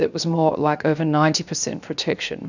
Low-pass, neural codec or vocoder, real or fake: 7.2 kHz; codec, 16 kHz, 0.7 kbps, FocalCodec; fake